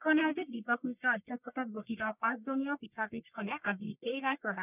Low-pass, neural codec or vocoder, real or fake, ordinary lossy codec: 3.6 kHz; codec, 44.1 kHz, 1.7 kbps, Pupu-Codec; fake; none